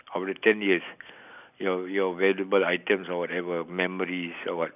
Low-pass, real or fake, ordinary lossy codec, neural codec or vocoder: 3.6 kHz; real; none; none